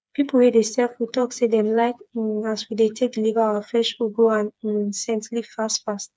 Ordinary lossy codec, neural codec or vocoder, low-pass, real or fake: none; codec, 16 kHz, 4 kbps, FreqCodec, smaller model; none; fake